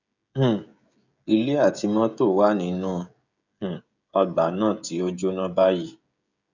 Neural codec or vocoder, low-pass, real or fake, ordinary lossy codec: codec, 16 kHz, 16 kbps, FreqCodec, smaller model; 7.2 kHz; fake; none